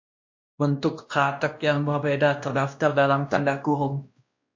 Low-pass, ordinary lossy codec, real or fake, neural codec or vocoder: 7.2 kHz; MP3, 48 kbps; fake; codec, 16 kHz, 1 kbps, X-Codec, WavLM features, trained on Multilingual LibriSpeech